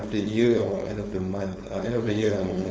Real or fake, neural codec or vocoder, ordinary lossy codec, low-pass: fake; codec, 16 kHz, 4.8 kbps, FACodec; none; none